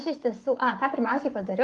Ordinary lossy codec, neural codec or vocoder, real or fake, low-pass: Opus, 24 kbps; codec, 44.1 kHz, 7.8 kbps, Pupu-Codec; fake; 10.8 kHz